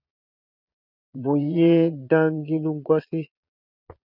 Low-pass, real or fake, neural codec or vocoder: 5.4 kHz; fake; vocoder, 22.05 kHz, 80 mel bands, Vocos